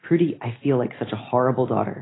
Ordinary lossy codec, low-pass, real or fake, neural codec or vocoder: AAC, 16 kbps; 7.2 kHz; real; none